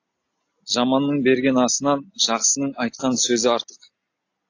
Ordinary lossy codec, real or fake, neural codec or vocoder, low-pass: AAC, 48 kbps; real; none; 7.2 kHz